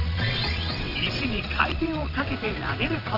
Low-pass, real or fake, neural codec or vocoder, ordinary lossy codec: 5.4 kHz; fake; vocoder, 22.05 kHz, 80 mel bands, WaveNeXt; Opus, 24 kbps